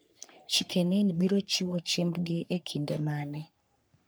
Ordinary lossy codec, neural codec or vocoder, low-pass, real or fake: none; codec, 44.1 kHz, 3.4 kbps, Pupu-Codec; none; fake